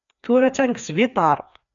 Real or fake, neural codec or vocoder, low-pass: fake; codec, 16 kHz, 2 kbps, FreqCodec, larger model; 7.2 kHz